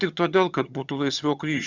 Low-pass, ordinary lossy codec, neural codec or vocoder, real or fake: 7.2 kHz; Opus, 64 kbps; vocoder, 22.05 kHz, 80 mel bands, HiFi-GAN; fake